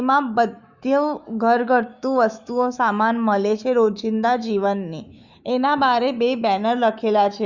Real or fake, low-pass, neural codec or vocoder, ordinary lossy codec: fake; 7.2 kHz; autoencoder, 48 kHz, 128 numbers a frame, DAC-VAE, trained on Japanese speech; Opus, 64 kbps